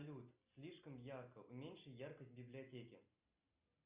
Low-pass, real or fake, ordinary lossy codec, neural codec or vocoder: 3.6 kHz; real; Opus, 64 kbps; none